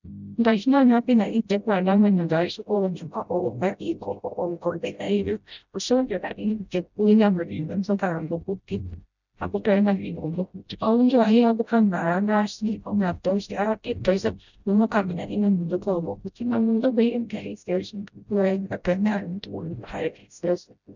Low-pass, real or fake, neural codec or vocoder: 7.2 kHz; fake; codec, 16 kHz, 0.5 kbps, FreqCodec, smaller model